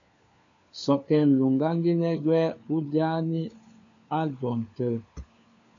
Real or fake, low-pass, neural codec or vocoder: fake; 7.2 kHz; codec, 16 kHz, 4 kbps, FunCodec, trained on LibriTTS, 50 frames a second